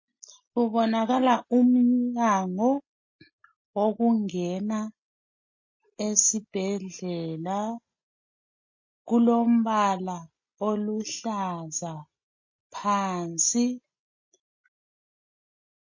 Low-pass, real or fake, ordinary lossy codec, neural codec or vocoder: 7.2 kHz; real; MP3, 32 kbps; none